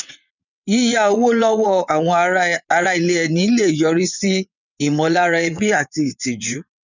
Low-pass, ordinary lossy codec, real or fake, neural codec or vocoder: 7.2 kHz; none; fake; vocoder, 22.05 kHz, 80 mel bands, WaveNeXt